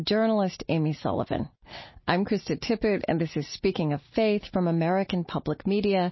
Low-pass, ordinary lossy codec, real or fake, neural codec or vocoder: 7.2 kHz; MP3, 24 kbps; real; none